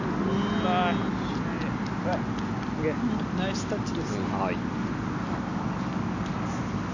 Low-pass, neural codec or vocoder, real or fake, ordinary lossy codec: 7.2 kHz; none; real; none